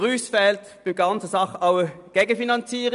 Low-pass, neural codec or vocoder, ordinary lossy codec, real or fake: 10.8 kHz; none; none; real